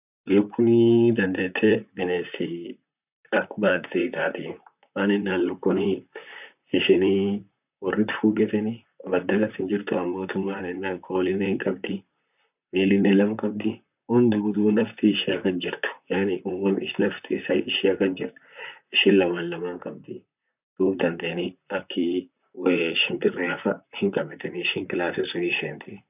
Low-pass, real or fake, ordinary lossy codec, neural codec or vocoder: 3.6 kHz; fake; none; vocoder, 44.1 kHz, 128 mel bands, Pupu-Vocoder